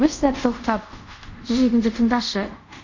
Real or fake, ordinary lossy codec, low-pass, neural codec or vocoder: fake; Opus, 64 kbps; 7.2 kHz; codec, 24 kHz, 0.5 kbps, DualCodec